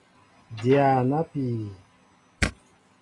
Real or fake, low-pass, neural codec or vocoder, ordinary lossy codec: real; 10.8 kHz; none; AAC, 32 kbps